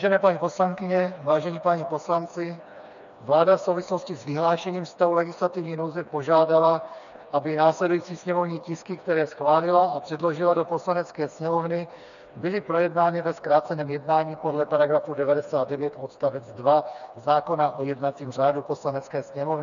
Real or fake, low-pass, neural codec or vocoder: fake; 7.2 kHz; codec, 16 kHz, 2 kbps, FreqCodec, smaller model